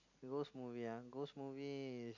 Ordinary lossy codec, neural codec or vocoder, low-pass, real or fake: none; none; 7.2 kHz; real